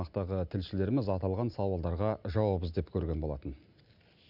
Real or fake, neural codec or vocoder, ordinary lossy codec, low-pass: real; none; none; 5.4 kHz